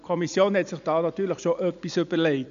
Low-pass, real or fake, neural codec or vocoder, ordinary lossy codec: 7.2 kHz; real; none; none